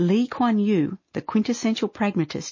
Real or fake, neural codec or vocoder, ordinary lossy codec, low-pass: real; none; MP3, 32 kbps; 7.2 kHz